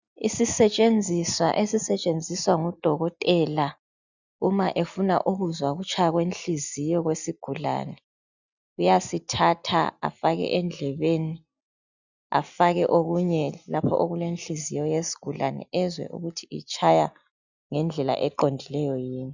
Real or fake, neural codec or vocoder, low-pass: real; none; 7.2 kHz